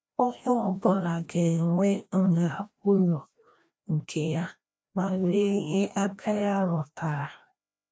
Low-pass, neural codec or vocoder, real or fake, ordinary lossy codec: none; codec, 16 kHz, 1 kbps, FreqCodec, larger model; fake; none